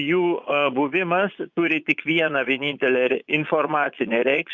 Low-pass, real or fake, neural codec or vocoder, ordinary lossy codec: 7.2 kHz; fake; vocoder, 22.05 kHz, 80 mel bands, Vocos; Opus, 64 kbps